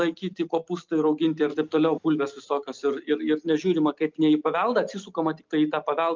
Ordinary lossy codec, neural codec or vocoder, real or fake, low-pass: Opus, 24 kbps; none; real; 7.2 kHz